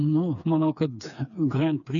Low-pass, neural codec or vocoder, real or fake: 7.2 kHz; codec, 16 kHz, 4 kbps, FreqCodec, smaller model; fake